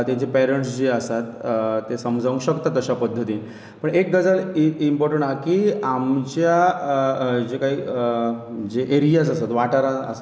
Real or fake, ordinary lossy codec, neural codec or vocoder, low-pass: real; none; none; none